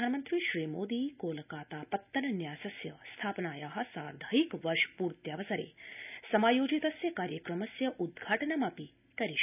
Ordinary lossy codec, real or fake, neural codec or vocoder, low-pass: none; real; none; 3.6 kHz